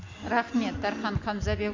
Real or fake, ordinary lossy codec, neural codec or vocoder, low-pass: real; MP3, 48 kbps; none; 7.2 kHz